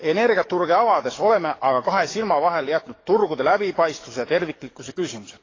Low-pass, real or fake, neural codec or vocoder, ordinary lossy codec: 7.2 kHz; fake; codec, 44.1 kHz, 7.8 kbps, DAC; AAC, 32 kbps